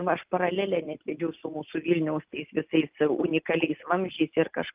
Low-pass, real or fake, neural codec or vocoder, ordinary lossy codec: 3.6 kHz; real; none; Opus, 32 kbps